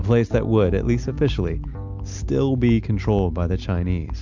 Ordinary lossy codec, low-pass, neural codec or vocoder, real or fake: MP3, 64 kbps; 7.2 kHz; none; real